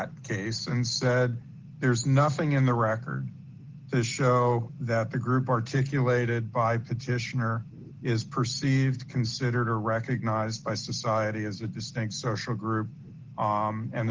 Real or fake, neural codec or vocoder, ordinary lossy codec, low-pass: real; none; Opus, 16 kbps; 7.2 kHz